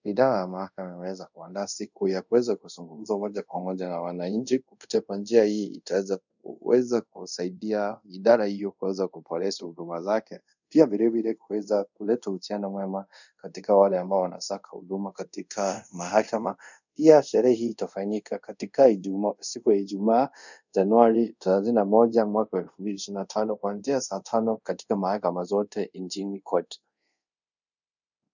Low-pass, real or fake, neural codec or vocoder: 7.2 kHz; fake; codec, 24 kHz, 0.5 kbps, DualCodec